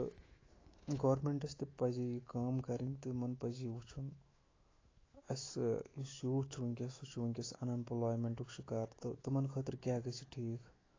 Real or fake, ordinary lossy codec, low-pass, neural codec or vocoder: fake; AAC, 32 kbps; 7.2 kHz; autoencoder, 48 kHz, 128 numbers a frame, DAC-VAE, trained on Japanese speech